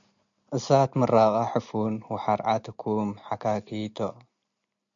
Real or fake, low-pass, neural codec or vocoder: real; 7.2 kHz; none